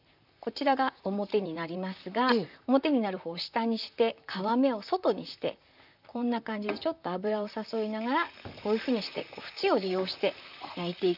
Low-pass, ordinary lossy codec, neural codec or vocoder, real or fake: 5.4 kHz; none; vocoder, 44.1 kHz, 128 mel bands, Pupu-Vocoder; fake